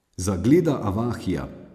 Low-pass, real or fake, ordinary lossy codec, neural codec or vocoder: 14.4 kHz; real; AAC, 96 kbps; none